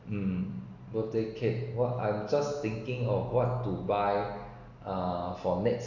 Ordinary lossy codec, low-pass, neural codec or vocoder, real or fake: Opus, 64 kbps; 7.2 kHz; none; real